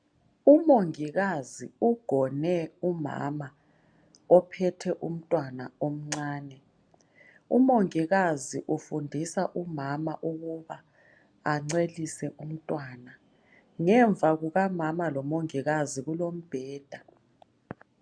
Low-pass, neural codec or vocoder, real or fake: 9.9 kHz; none; real